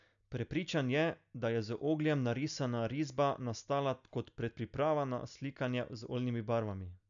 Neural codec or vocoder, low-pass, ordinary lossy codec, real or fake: none; 7.2 kHz; none; real